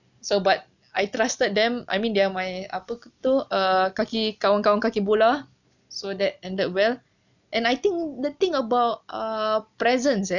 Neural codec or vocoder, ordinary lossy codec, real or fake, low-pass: none; none; real; 7.2 kHz